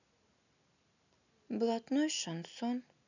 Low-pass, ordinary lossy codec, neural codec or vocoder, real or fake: 7.2 kHz; none; none; real